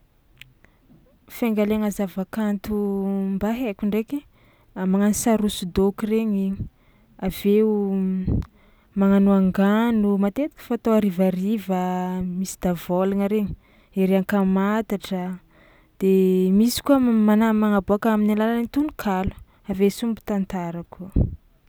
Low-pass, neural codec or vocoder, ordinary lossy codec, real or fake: none; none; none; real